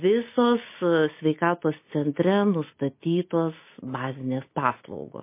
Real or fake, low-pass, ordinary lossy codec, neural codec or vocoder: real; 3.6 kHz; MP3, 24 kbps; none